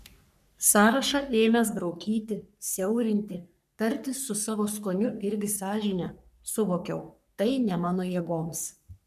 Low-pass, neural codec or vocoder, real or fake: 14.4 kHz; codec, 44.1 kHz, 3.4 kbps, Pupu-Codec; fake